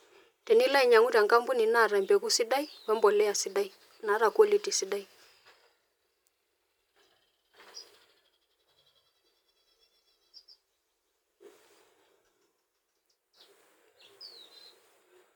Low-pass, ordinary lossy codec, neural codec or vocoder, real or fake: 19.8 kHz; none; none; real